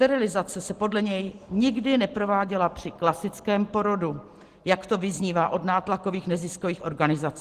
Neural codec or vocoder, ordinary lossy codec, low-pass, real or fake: none; Opus, 16 kbps; 14.4 kHz; real